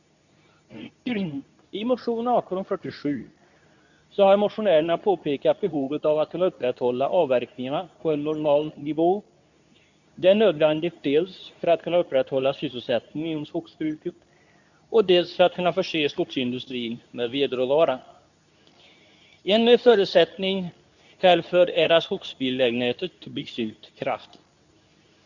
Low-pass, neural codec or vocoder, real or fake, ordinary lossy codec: 7.2 kHz; codec, 24 kHz, 0.9 kbps, WavTokenizer, medium speech release version 2; fake; none